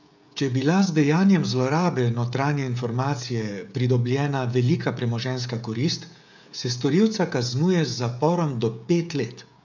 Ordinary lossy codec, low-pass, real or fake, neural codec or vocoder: none; 7.2 kHz; fake; codec, 16 kHz, 16 kbps, FreqCodec, smaller model